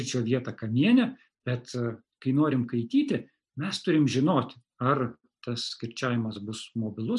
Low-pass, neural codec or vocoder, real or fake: 10.8 kHz; none; real